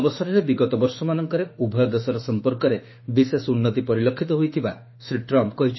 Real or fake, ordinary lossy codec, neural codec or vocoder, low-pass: fake; MP3, 24 kbps; autoencoder, 48 kHz, 32 numbers a frame, DAC-VAE, trained on Japanese speech; 7.2 kHz